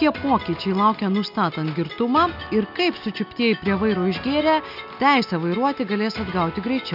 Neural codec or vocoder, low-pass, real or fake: none; 5.4 kHz; real